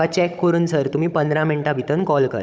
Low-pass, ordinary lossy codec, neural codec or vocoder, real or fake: none; none; codec, 16 kHz, 16 kbps, FunCodec, trained on Chinese and English, 50 frames a second; fake